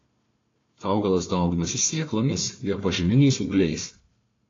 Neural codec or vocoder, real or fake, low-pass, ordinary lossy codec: codec, 16 kHz, 1 kbps, FunCodec, trained on Chinese and English, 50 frames a second; fake; 7.2 kHz; AAC, 32 kbps